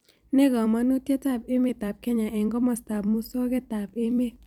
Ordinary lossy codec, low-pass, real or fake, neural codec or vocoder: none; 19.8 kHz; fake; vocoder, 44.1 kHz, 128 mel bands every 256 samples, BigVGAN v2